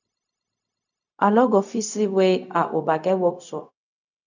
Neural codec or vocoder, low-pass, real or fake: codec, 16 kHz, 0.4 kbps, LongCat-Audio-Codec; 7.2 kHz; fake